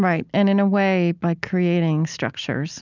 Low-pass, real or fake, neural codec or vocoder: 7.2 kHz; real; none